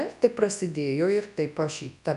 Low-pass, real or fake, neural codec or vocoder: 10.8 kHz; fake; codec, 24 kHz, 0.9 kbps, WavTokenizer, large speech release